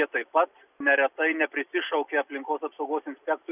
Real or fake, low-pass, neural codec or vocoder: real; 3.6 kHz; none